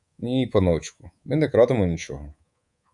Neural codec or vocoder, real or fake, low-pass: codec, 24 kHz, 3.1 kbps, DualCodec; fake; 10.8 kHz